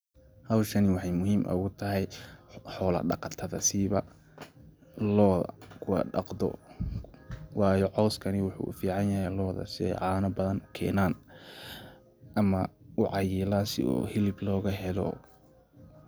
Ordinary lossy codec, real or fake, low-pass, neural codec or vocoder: none; real; none; none